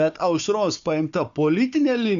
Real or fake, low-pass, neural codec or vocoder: fake; 7.2 kHz; codec, 16 kHz, 4 kbps, FunCodec, trained on Chinese and English, 50 frames a second